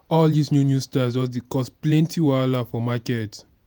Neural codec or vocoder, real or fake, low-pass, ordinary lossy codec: vocoder, 48 kHz, 128 mel bands, Vocos; fake; none; none